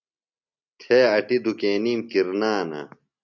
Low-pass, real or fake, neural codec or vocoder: 7.2 kHz; real; none